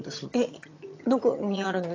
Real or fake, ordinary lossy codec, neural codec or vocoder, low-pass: fake; AAC, 32 kbps; vocoder, 22.05 kHz, 80 mel bands, HiFi-GAN; 7.2 kHz